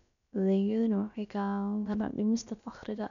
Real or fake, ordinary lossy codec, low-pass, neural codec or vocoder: fake; none; 7.2 kHz; codec, 16 kHz, about 1 kbps, DyCAST, with the encoder's durations